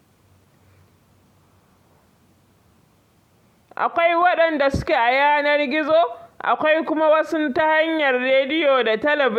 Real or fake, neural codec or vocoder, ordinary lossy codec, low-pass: real; none; MP3, 96 kbps; 19.8 kHz